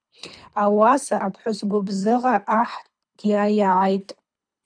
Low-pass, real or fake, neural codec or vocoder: 9.9 kHz; fake; codec, 24 kHz, 3 kbps, HILCodec